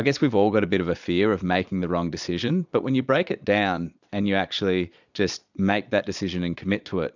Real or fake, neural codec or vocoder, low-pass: fake; vocoder, 22.05 kHz, 80 mel bands, Vocos; 7.2 kHz